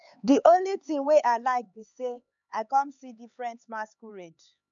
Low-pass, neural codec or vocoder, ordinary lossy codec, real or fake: 7.2 kHz; codec, 16 kHz, 4 kbps, X-Codec, HuBERT features, trained on LibriSpeech; none; fake